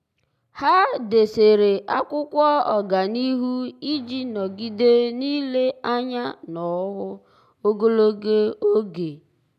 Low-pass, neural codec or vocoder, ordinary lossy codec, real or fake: 14.4 kHz; none; none; real